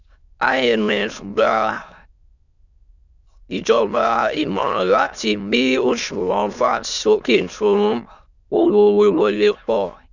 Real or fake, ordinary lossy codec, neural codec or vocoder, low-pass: fake; none; autoencoder, 22.05 kHz, a latent of 192 numbers a frame, VITS, trained on many speakers; 7.2 kHz